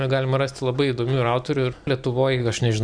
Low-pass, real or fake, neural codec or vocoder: 9.9 kHz; real; none